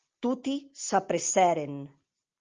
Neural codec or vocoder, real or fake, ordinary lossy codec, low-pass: none; real; Opus, 24 kbps; 7.2 kHz